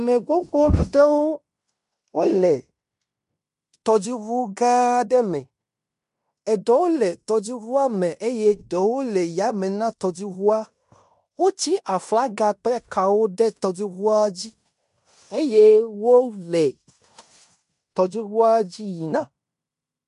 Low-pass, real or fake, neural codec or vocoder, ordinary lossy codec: 10.8 kHz; fake; codec, 16 kHz in and 24 kHz out, 0.9 kbps, LongCat-Audio-Codec, fine tuned four codebook decoder; MP3, 64 kbps